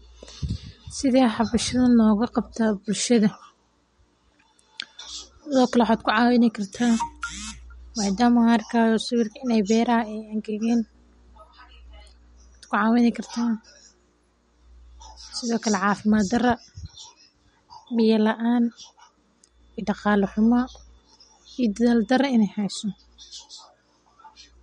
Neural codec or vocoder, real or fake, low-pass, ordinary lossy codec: none; real; 10.8 kHz; MP3, 48 kbps